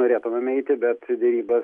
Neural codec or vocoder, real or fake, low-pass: none; real; 10.8 kHz